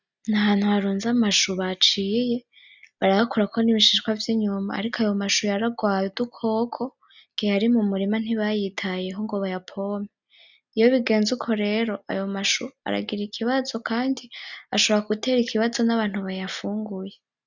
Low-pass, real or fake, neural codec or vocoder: 7.2 kHz; real; none